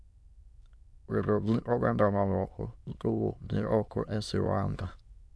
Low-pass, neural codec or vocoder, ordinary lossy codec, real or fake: none; autoencoder, 22.05 kHz, a latent of 192 numbers a frame, VITS, trained on many speakers; none; fake